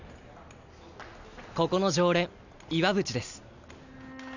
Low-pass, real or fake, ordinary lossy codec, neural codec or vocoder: 7.2 kHz; real; none; none